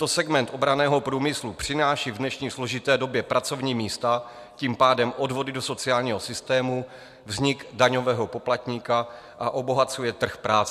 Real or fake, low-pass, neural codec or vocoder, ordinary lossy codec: real; 14.4 kHz; none; MP3, 96 kbps